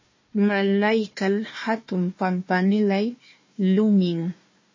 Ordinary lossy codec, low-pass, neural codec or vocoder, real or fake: MP3, 32 kbps; 7.2 kHz; codec, 16 kHz, 1 kbps, FunCodec, trained on Chinese and English, 50 frames a second; fake